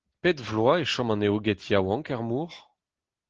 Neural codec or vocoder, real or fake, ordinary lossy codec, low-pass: none; real; Opus, 16 kbps; 7.2 kHz